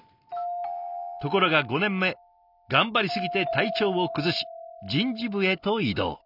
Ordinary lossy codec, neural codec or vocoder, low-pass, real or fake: none; none; 5.4 kHz; real